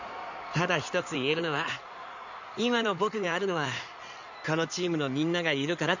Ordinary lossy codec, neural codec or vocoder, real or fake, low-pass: none; codec, 16 kHz in and 24 kHz out, 2.2 kbps, FireRedTTS-2 codec; fake; 7.2 kHz